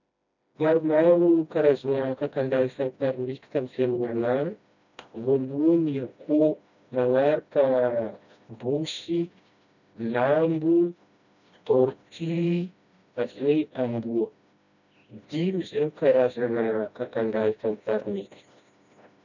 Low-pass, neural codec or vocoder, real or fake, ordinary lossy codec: 7.2 kHz; codec, 16 kHz, 1 kbps, FreqCodec, smaller model; fake; none